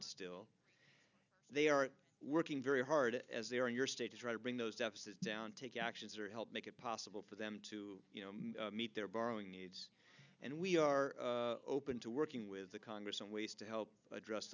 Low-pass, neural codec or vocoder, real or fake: 7.2 kHz; none; real